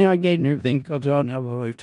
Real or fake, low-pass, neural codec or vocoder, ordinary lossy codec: fake; 10.8 kHz; codec, 16 kHz in and 24 kHz out, 0.4 kbps, LongCat-Audio-Codec, four codebook decoder; none